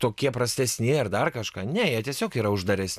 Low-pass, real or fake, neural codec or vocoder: 14.4 kHz; real; none